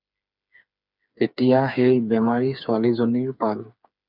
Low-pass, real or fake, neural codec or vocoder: 5.4 kHz; fake; codec, 16 kHz, 4 kbps, FreqCodec, smaller model